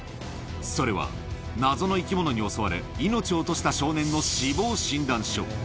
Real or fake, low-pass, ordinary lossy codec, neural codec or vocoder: real; none; none; none